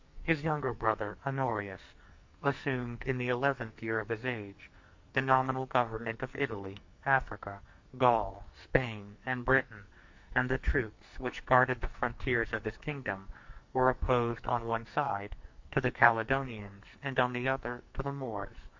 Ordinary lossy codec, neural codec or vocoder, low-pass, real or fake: MP3, 48 kbps; codec, 44.1 kHz, 2.6 kbps, SNAC; 7.2 kHz; fake